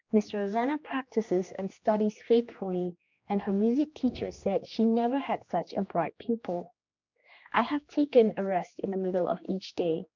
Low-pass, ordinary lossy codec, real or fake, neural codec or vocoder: 7.2 kHz; MP3, 64 kbps; fake; codec, 16 kHz, 2 kbps, X-Codec, HuBERT features, trained on general audio